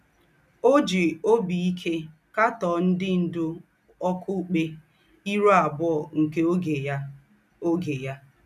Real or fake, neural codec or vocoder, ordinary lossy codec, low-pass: real; none; none; 14.4 kHz